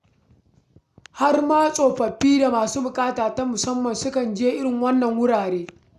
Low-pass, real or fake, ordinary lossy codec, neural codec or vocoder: 14.4 kHz; real; none; none